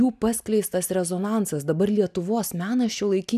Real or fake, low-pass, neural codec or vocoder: real; 14.4 kHz; none